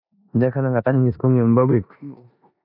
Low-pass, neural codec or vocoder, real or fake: 5.4 kHz; codec, 16 kHz in and 24 kHz out, 0.9 kbps, LongCat-Audio-Codec, four codebook decoder; fake